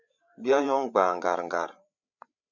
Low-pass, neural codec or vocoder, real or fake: 7.2 kHz; codec, 16 kHz, 8 kbps, FreqCodec, larger model; fake